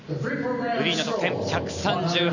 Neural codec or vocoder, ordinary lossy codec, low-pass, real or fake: none; none; 7.2 kHz; real